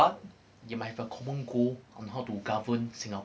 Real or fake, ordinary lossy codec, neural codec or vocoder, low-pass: real; none; none; none